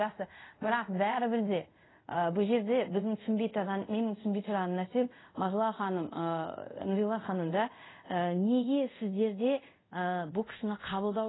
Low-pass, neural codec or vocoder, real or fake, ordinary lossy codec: 7.2 kHz; codec, 24 kHz, 0.5 kbps, DualCodec; fake; AAC, 16 kbps